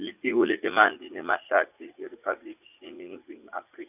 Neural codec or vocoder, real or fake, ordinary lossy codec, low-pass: vocoder, 44.1 kHz, 80 mel bands, Vocos; fake; none; 3.6 kHz